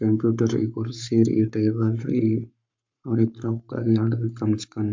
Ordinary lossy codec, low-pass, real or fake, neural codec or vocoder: MP3, 64 kbps; 7.2 kHz; fake; codec, 44.1 kHz, 7.8 kbps, Pupu-Codec